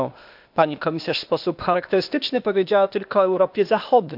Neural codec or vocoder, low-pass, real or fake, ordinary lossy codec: codec, 16 kHz, 0.8 kbps, ZipCodec; 5.4 kHz; fake; none